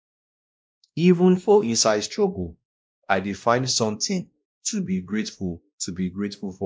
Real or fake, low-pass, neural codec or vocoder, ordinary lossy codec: fake; none; codec, 16 kHz, 1 kbps, X-Codec, WavLM features, trained on Multilingual LibriSpeech; none